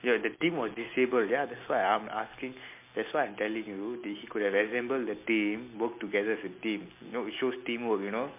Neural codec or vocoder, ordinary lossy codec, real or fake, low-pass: none; MP3, 24 kbps; real; 3.6 kHz